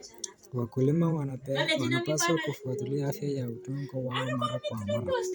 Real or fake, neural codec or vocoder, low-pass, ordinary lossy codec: fake; vocoder, 44.1 kHz, 128 mel bands every 512 samples, BigVGAN v2; none; none